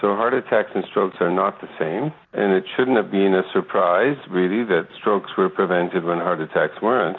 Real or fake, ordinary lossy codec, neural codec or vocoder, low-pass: real; MP3, 64 kbps; none; 7.2 kHz